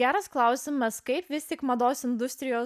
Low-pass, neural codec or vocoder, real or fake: 14.4 kHz; none; real